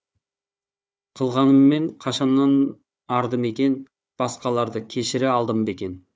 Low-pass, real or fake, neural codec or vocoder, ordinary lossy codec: none; fake; codec, 16 kHz, 4 kbps, FunCodec, trained on Chinese and English, 50 frames a second; none